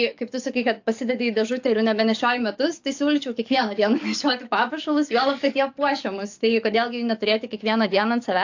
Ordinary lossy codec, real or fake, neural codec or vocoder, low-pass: AAC, 48 kbps; fake; codec, 44.1 kHz, 7.8 kbps, DAC; 7.2 kHz